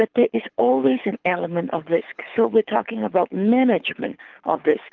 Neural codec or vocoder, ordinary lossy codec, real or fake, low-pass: codec, 24 kHz, 6 kbps, HILCodec; Opus, 32 kbps; fake; 7.2 kHz